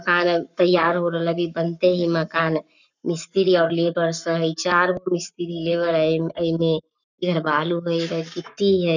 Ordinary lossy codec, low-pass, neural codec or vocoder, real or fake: none; 7.2 kHz; codec, 44.1 kHz, 7.8 kbps, Pupu-Codec; fake